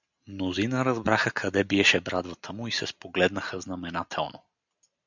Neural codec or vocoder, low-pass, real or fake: none; 7.2 kHz; real